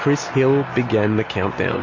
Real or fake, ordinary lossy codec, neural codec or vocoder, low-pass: real; MP3, 32 kbps; none; 7.2 kHz